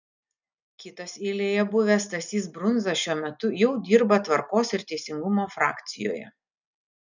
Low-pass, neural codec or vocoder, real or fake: 7.2 kHz; none; real